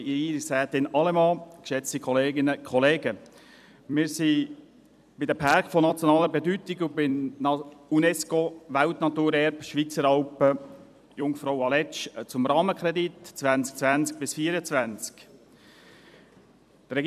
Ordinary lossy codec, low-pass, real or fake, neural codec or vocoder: none; 14.4 kHz; fake; vocoder, 44.1 kHz, 128 mel bands every 256 samples, BigVGAN v2